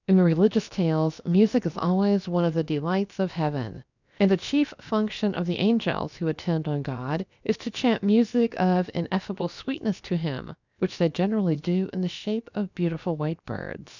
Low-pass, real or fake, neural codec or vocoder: 7.2 kHz; fake; codec, 16 kHz, about 1 kbps, DyCAST, with the encoder's durations